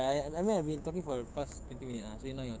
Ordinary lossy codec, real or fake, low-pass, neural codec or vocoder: none; fake; none; codec, 16 kHz, 6 kbps, DAC